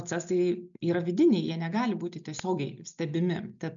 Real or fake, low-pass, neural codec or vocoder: real; 7.2 kHz; none